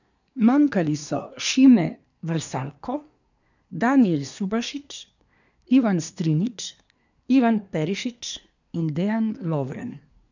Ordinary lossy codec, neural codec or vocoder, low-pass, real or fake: none; codec, 24 kHz, 1 kbps, SNAC; 7.2 kHz; fake